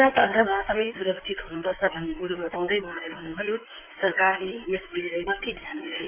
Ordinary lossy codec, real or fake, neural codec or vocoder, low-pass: none; fake; codec, 16 kHz in and 24 kHz out, 2.2 kbps, FireRedTTS-2 codec; 3.6 kHz